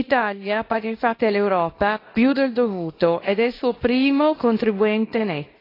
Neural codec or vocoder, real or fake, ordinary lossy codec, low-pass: codec, 24 kHz, 0.9 kbps, WavTokenizer, small release; fake; AAC, 24 kbps; 5.4 kHz